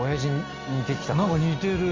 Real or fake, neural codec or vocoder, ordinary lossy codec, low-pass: real; none; Opus, 32 kbps; 7.2 kHz